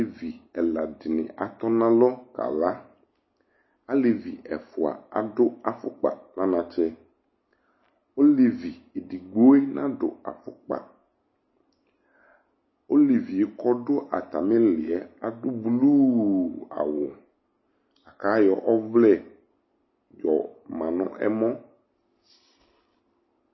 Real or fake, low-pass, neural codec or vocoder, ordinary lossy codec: real; 7.2 kHz; none; MP3, 24 kbps